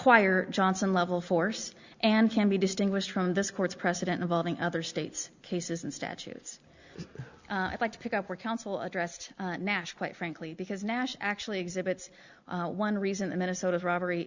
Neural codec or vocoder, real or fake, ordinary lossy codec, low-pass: none; real; Opus, 64 kbps; 7.2 kHz